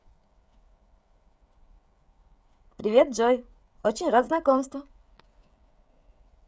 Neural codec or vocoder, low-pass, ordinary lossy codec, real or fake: codec, 16 kHz, 16 kbps, FreqCodec, smaller model; none; none; fake